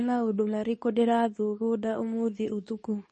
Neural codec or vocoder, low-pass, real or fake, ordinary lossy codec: codec, 24 kHz, 0.9 kbps, WavTokenizer, medium speech release version 2; 10.8 kHz; fake; MP3, 32 kbps